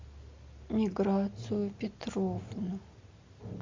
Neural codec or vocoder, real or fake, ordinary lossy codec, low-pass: none; real; MP3, 48 kbps; 7.2 kHz